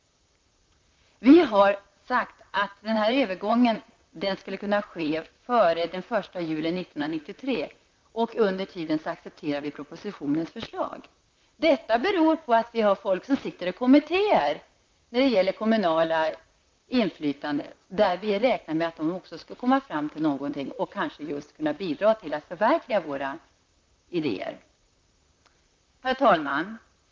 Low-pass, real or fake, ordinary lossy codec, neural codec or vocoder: 7.2 kHz; fake; Opus, 24 kbps; vocoder, 44.1 kHz, 128 mel bands, Pupu-Vocoder